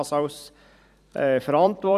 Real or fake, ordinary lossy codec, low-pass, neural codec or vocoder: fake; none; 14.4 kHz; vocoder, 44.1 kHz, 128 mel bands every 256 samples, BigVGAN v2